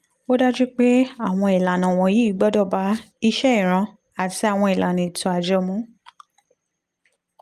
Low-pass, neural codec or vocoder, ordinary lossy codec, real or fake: 14.4 kHz; none; Opus, 32 kbps; real